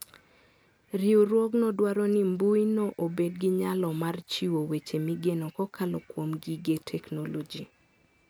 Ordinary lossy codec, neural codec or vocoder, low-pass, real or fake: none; none; none; real